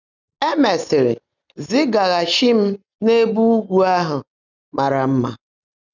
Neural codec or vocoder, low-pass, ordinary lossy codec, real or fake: none; 7.2 kHz; none; real